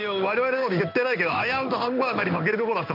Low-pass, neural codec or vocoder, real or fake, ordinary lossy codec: 5.4 kHz; codec, 16 kHz in and 24 kHz out, 1 kbps, XY-Tokenizer; fake; none